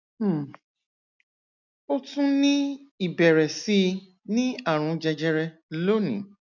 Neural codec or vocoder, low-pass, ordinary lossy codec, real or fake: none; 7.2 kHz; none; real